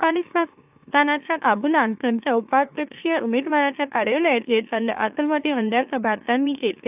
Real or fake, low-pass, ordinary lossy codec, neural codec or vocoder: fake; 3.6 kHz; AAC, 32 kbps; autoencoder, 44.1 kHz, a latent of 192 numbers a frame, MeloTTS